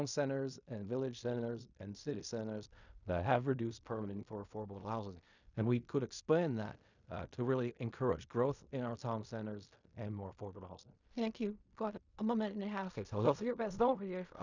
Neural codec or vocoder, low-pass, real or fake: codec, 16 kHz in and 24 kHz out, 0.4 kbps, LongCat-Audio-Codec, fine tuned four codebook decoder; 7.2 kHz; fake